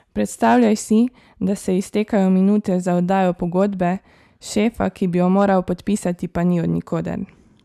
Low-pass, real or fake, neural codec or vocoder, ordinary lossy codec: 14.4 kHz; real; none; none